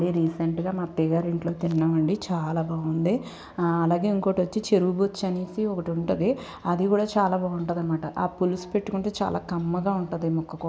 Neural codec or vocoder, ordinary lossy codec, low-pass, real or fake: none; none; none; real